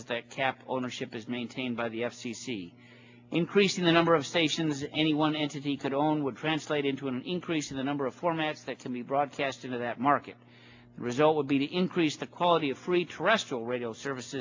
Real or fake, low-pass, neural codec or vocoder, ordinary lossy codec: real; 7.2 kHz; none; AAC, 48 kbps